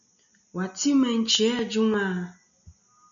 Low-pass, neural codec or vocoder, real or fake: 7.2 kHz; none; real